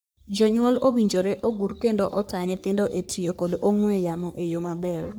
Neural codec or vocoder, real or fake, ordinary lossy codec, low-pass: codec, 44.1 kHz, 3.4 kbps, Pupu-Codec; fake; none; none